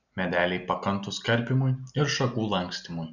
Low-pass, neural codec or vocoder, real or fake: 7.2 kHz; none; real